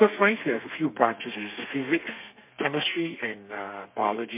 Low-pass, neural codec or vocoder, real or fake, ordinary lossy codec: 3.6 kHz; codec, 32 kHz, 1.9 kbps, SNAC; fake; MP3, 32 kbps